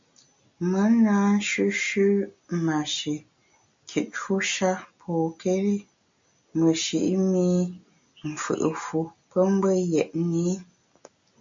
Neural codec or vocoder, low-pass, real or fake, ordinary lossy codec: none; 7.2 kHz; real; MP3, 48 kbps